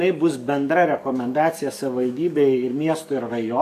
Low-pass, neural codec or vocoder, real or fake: 14.4 kHz; codec, 44.1 kHz, 7.8 kbps, DAC; fake